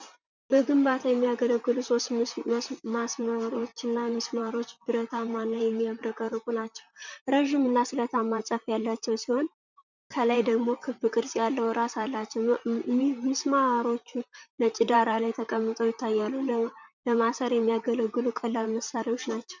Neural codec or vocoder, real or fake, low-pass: vocoder, 44.1 kHz, 128 mel bands, Pupu-Vocoder; fake; 7.2 kHz